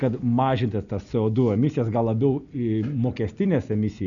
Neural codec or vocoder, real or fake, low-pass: none; real; 7.2 kHz